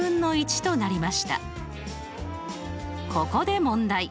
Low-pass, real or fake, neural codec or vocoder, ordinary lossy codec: none; real; none; none